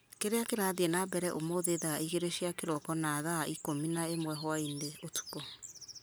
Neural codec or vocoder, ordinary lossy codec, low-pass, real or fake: none; none; none; real